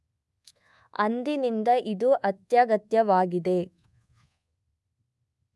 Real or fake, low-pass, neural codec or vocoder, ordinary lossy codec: fake; none; codec, 24 kHz, 1.2 kbps, DualCodec; none